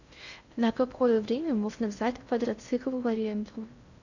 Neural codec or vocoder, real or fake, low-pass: codec, 16 kHz in and 24 kHz out, 0.6 kbps, FocalCodec, streaming, 2048 codes; fake; 7.2 kHz